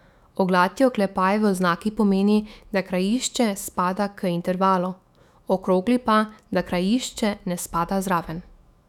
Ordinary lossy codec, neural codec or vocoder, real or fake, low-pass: none; autoencoder, 48 kHz, 128 numbers a frame, DAC-VAE, trained on Japanese speech; fake; 19.8 kHz